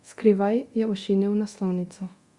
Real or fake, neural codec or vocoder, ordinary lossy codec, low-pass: fake; codec, 24 kHz, 0.9 kbps, DualCodec; Opus, 64 kbps; 10.8 kHz